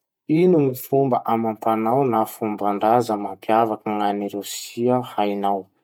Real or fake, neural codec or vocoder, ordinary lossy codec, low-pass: fake; vocoder, 48 kHz, 128 mel bands, Vocos; none; 19.8 kHz